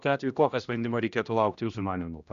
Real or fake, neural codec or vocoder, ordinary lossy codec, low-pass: fake; codec, 16 kHz, 1 kbps, X-Codec, HuBERT features, trained on general audio; Opus, 64 kbps; 7.2 kHz